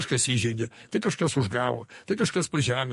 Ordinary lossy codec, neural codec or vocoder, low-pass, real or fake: MP3, 48 kbps; codec, 44.1 kHz, 2.6 kbps, SNAC; 14.4 kHz; fake